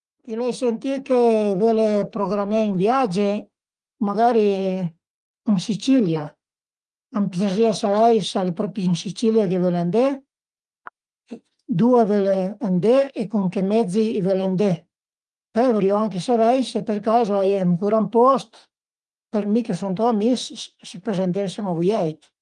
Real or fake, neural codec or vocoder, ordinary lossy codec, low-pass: fake; autoencoder, 48 kHz, 32 numbers a frame, DAC-VAE, trained on Japanese speech; Opus, 32 kbps; 10.8 kHz